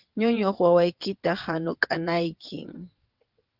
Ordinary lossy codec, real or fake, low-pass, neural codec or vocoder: Opus, 24 kbps; fake; 5.4 kHz; vocoder, 22.05 kHz, 80 mel bands, WaveNeXt